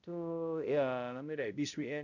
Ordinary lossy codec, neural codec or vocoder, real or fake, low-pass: none; codec, 16 kHz, 0.5 kbps, X-Codec, HuBERT features, trained on balanced general audio; fake; 7.2 kHz